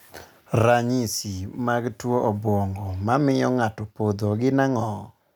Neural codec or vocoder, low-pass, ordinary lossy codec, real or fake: none; none; none; real